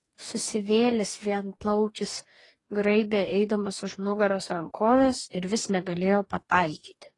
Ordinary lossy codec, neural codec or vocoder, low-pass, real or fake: AAC, 32 kbps; codec, 44.1 kHz, 2.6 kbps, DAC; 10.8 kHz; fake